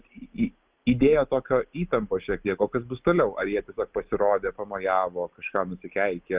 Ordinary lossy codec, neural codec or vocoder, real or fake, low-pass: Opus, 24 kbps; none; real; 3.6 kHz